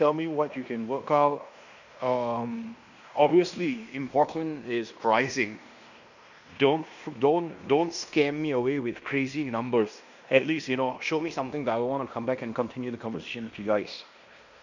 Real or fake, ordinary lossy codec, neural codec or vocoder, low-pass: fake; none; codec, 16 kHz in and 24 kHz out, 0.9 kbps, LongCat-Audio-Codec, fine tuned four codebook decoder; 7.2 kHz